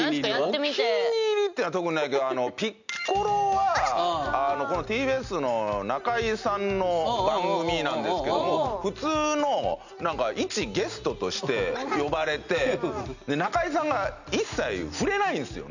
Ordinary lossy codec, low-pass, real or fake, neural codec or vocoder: none; 7.2 kHz; real; none